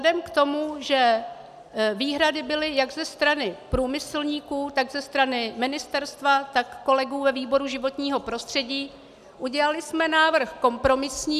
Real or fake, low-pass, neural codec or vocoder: real; 14.4 kHz; none